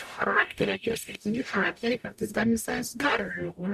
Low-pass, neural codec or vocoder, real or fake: 14.4 kHz; codec, 44.1 kHz, 0.9 kbps, DAC; fake